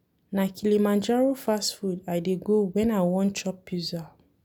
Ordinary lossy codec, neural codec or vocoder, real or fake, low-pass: none; none; real; 19.8 kHz